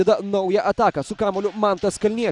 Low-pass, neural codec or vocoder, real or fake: 10.8 kHz; none; real